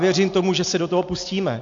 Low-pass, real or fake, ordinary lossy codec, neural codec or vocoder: 7.2 kHz; real; AAC, 64 kbps; none